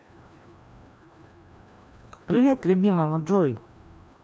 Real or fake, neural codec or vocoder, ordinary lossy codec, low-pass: fake; codec, 16 kHz, 1 kbps, FreqCodec, larger model; none; none